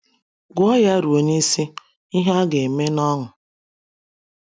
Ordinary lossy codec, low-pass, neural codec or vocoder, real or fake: none; none; none; real